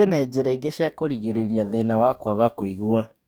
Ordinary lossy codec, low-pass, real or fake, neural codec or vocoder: none; none; fake; codec, 44.1 kHz, 2.6 kbps, DAC